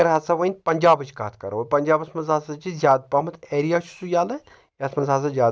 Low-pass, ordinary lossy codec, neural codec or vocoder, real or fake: none; none; none; real